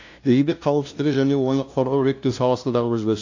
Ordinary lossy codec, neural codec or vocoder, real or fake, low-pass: AAC, 48 kbps; codec, 16 kHz, 0.5 kbps, FunCodec, trained on LibriTTS, 25 frames a second; fake; 7.2 kHz